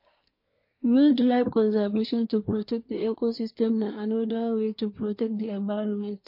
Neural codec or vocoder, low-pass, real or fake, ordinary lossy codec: codec, 24 kHz, 1 kbps, SNAC; 5.4 kHz; fake; MP3, 32 kbps